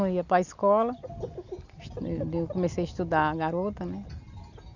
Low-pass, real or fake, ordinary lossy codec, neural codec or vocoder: 7.2 kHz; real; none; none